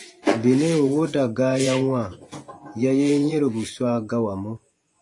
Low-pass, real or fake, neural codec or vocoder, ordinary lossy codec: 10.8 kHz; fake; vocoder, 24 kHz, 100 mel bands, Vocos; AAC, 48 kbps